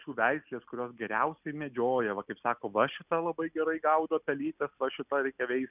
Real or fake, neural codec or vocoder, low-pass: real; none; 3.6 kHz